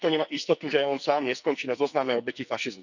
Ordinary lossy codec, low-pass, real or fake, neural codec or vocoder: none; 7.2 kHz; fake; codec, 44.1 kHz, 2.6 kbps, SNAC